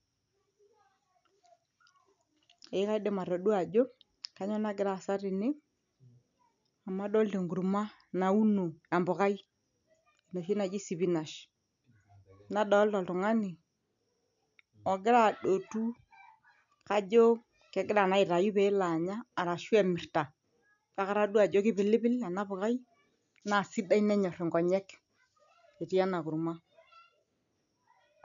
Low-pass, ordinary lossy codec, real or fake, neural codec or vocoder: 7.2 kHz; none; real; none